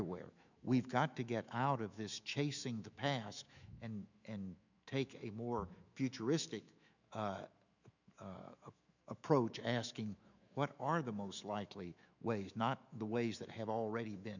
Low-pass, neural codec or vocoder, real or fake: 7.2 kHz; autoencoder, 48 kHz, 128 numbers a frame, DAC-VAE, trained on Japanese speech; fake